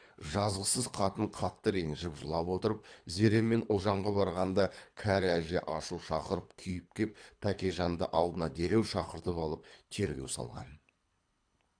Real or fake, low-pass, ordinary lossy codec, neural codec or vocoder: fake; 9.9 kHz; AAC, 64 kbps; codec, 24 kHz, 3 kbps, HILCodec